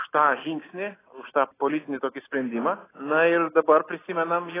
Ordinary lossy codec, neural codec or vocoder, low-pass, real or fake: AAC, 16 kbps; none; 3.6 kHz; real